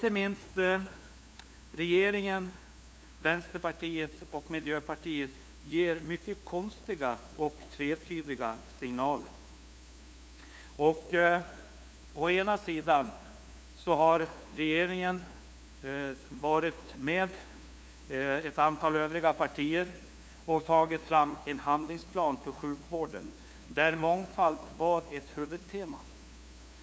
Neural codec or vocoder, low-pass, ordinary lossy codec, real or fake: codec, 16 kHz, 2 kbps, FunCodec, trained on LibriTTS, 25 frames a second; none; none; fake